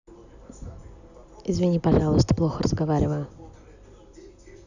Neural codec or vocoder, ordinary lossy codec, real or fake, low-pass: none; none; real; 7.2 kHz